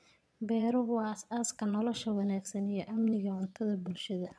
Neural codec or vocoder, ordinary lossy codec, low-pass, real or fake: vocoder, 22.05 kHz, 80 mel bands, Vocos; none; none; fake